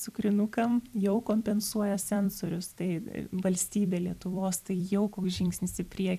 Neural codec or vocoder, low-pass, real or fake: vocoder, 44.1 kHz, 128 mel bands every 256 samples, BigVGAN v2; 14.4 kHz; fake